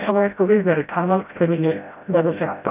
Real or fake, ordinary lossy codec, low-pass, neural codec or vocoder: fake; none; 3.6 kHz; codec, 16 kHz, 0.5 kbps, FreqCodec, smaller model